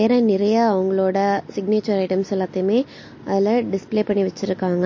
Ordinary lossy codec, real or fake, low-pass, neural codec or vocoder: MP3, 32 kbps; real; 7.2 kHz; none